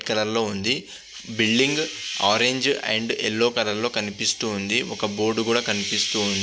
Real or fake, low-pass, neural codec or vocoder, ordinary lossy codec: real; none; none; none